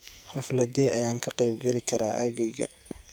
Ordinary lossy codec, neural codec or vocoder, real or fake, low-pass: none; codec, 44.1 kHz, 2.6 kbps, SNAC; fake; none